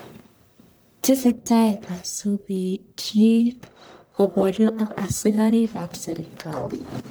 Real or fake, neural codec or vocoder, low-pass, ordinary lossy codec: fake; codec, 44.1 kHz, 1.7 kbps, Pupu-Codec; none; none